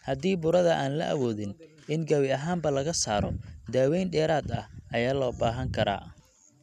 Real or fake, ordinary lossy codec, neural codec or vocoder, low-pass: real; none; none; 14.4 kHz